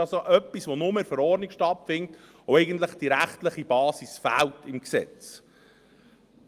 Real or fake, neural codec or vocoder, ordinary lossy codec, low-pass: real; none; Opus, 32 kbps; 14.4 kHz